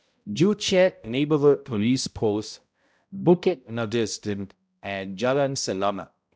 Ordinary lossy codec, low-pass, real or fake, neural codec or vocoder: none; none; fake; codec, 16 kHz, 0.5 kbps, X-Codec, HuBERT features, trained on balanced general audio